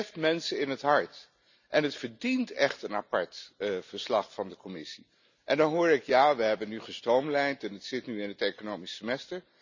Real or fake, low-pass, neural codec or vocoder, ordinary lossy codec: real; 7.2 kHz; none; none